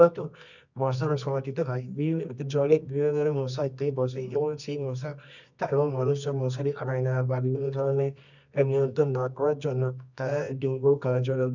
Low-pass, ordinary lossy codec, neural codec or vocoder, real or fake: 7.2 kHz; none; codec, 24 kHz, 0.9 kbps, WavTokenizer, medium music audio release; fake